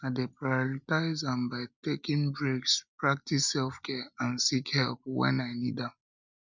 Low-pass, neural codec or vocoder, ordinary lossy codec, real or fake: 7.2 kHz; none; none; real